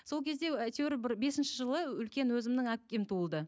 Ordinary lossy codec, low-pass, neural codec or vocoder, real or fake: none; none; none; real